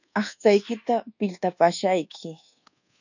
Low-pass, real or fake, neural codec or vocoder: 7.2 kHz; fake; codec, 24 kHz, 1.2 kbps, DualCodec